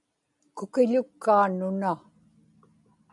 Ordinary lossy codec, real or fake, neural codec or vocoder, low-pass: MP3, 64 kbps; real; none; 10.8 kHz